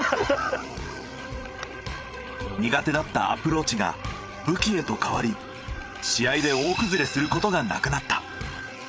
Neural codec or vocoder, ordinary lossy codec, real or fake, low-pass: codec, 16 kHz, 8 kbps, FreqCodec, larger model; none; fake; none